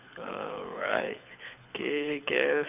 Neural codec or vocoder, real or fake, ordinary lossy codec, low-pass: codec, 24 kHz, 6 kbps, HILCodec; fake; none; 3.6 kHz